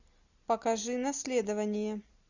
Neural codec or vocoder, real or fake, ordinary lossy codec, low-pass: none; real; Opus, 64 kbps; 7.2 kHz